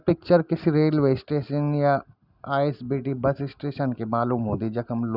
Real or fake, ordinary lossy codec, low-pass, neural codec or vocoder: real; none; 5.4 kHz; none